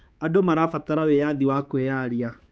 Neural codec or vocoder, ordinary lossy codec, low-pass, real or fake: codec, 16 kHz, 4 kbps, X-Codec, HuBERT features, trained on balanced general audio; none; none; fake